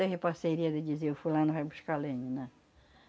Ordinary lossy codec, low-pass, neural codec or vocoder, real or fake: none; none; none; real